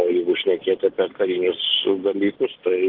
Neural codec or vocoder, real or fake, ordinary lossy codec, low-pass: none; real; Opus, 16 kbps; 5.4 kHz